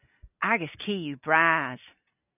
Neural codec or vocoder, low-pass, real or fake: none; 3.6 kHz; real